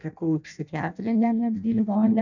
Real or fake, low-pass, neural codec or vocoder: fake; 7.2 kHz; codec, 16 kHz in and 24 kHz out, 0.6 kbps, FireRedTTS-2 codec